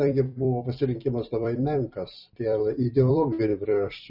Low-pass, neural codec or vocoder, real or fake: 5.4 kHz; vocoder, 44.1 kHz, 128 mel bands every 256 samples, BigVGAN v2; fake